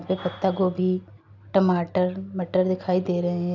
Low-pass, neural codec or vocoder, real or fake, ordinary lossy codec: 7.2 kHz; none; real; none